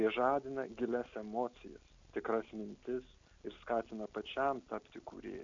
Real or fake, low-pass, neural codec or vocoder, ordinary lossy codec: real; 7.2 kHz; none; MP3, 64 kbps